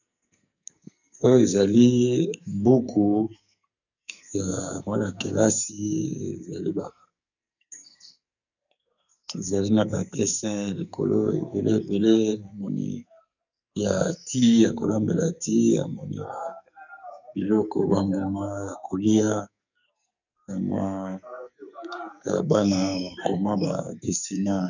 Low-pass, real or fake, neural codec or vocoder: 7.2 kHz; fake; codec, 44.1 kHz, 2.6 kbps, SNAC